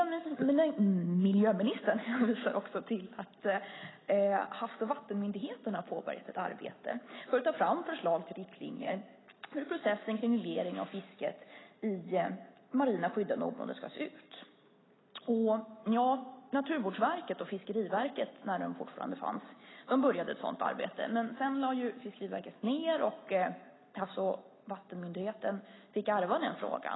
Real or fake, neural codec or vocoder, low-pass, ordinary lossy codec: real; none; 7.2 kHz; AAC, 16 kbps